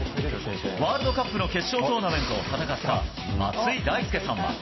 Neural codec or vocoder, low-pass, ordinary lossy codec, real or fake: none; 7.2 kHz; MP3, 24 kbps; real